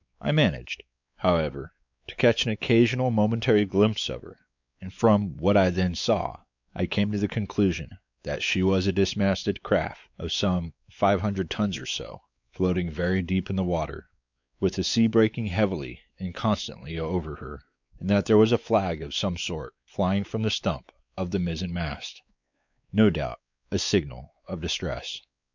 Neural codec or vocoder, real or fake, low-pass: codec, 16 kHz, 4 kbps, X-Codec, WavLM features, trained on Multilingual LibriSpeech; fake; 7.2 kHz